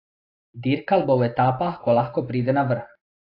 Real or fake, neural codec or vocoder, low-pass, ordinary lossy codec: real; none; 5.4 kHz; AAC, 32 kbps